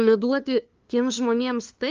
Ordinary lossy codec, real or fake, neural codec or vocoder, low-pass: Opus, 24 kbps; fake; codec, 16 kHz, 2 kbps, FunCodec, trained on LibriTTS, 25 frames a second; 7.2 kHz